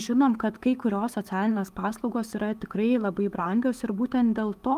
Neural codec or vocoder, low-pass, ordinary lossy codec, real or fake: autoencoder, 48 kHz, 128 numbers a frame, DAC-VAE, trained on Japanese speech; 14.4 kHz; Opus, 32 kbps; fake